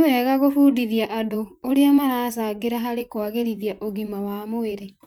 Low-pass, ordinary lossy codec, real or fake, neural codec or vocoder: 19.8 kHz; none; fake; vocoder, 44.1 kHz, 128 mel bands, Pupu-Vocoder